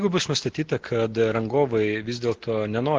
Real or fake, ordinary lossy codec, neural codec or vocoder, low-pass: real; Opus, 16 kbps; none; 7.2 kHz